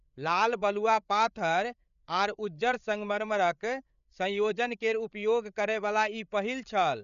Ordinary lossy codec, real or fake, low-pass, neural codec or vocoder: none; fake; 7.2 kHz; codec, 16 kHz, 8 kbps, FreqCodec, larger model